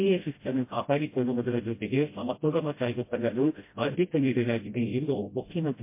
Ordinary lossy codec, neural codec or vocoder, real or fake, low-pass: MP3, 24 kbps; codec, 16 kHz, 0.5 kbps, FreqCodec, smaller model; fake; 3.6 kHz